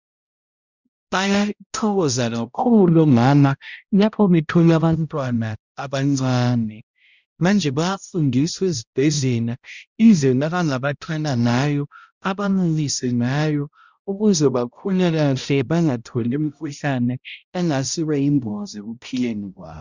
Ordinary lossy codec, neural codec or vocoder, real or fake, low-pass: Opus, 64 kbps; codec, 16 kHz, 0.5 kbps, X-Codec, HuBERT features, trained on balanced general audio; fake; 7.2 kHz